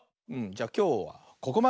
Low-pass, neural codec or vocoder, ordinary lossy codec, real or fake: none; none; none; real